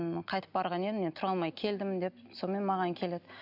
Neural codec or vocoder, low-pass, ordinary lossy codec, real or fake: none; 5.4 kHz; none; real